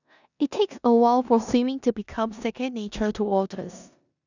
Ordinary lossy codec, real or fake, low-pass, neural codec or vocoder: none; fake; 7.2 kHz; codec, 16 kHz in and 24 kHz out, 0.9 kbps, LongCat-Audio-Codec, four codebook decoder